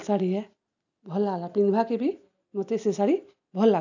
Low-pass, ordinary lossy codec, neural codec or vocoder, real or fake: 7.2 kHz; none; none; real